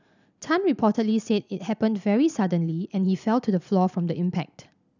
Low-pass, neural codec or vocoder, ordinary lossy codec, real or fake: 7.2 kHz; none; none; real